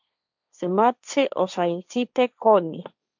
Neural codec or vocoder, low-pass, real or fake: codec, 16 kHz, 1.1 kbps, Voila-Tokenizer; 7.2 kHz; fake